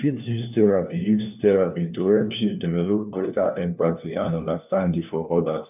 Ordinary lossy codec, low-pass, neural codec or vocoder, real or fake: none; 3.6 kHz; codec, 16 kHz, 1 kbps, FunCodec, trained on LibriTTS, 50 frames a second; fake